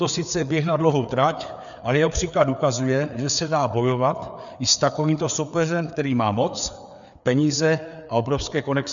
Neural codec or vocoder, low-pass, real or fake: codec, 16 kHz, 4 kbps, FreqCodec, larger model; 7.2 kHz; fake